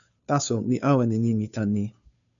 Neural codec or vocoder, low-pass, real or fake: codec, 16 kHz, 2 kbps, FunCodec, trained on Chinese and English, 25 frames a second; 7.2 kHz; fake